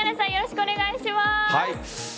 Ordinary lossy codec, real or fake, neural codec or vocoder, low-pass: none; real; none; none